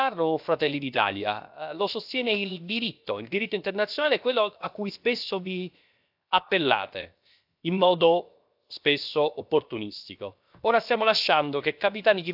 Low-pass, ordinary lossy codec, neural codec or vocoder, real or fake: 5.4 kHz; AAC, 48 kbps; codec, 16 kHz, 0.7 kbps, FocalCodec; fake